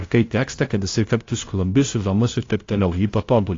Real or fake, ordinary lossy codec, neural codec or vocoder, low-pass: fake; AAC, 32 kbps; codec, 16 kHz, 0.5 kbps, FunCodec, trained on LibriTTS, 25 frames a second; 7.2 kHz